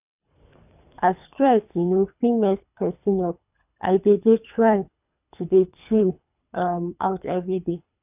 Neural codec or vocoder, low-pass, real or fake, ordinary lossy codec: codec, 24 kHz, 3 kbps, HILCodec; 3.6 kHz; fake; none